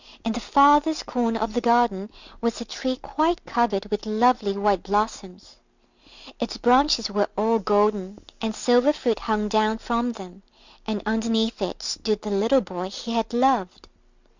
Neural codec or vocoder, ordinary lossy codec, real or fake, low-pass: none; Opus, 64 kbps; real; 7.2 kHz